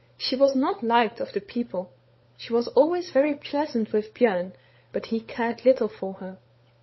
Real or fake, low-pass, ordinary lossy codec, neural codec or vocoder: fake; 7.2 kHz; MP3, 24 kbps; codec, 16 kHz, 16 kbps, FunCodec, trained on LibriTTS, 50 frames a second